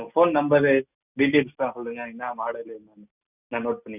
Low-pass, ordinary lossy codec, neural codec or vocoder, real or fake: 3.6 kHz; none; none; real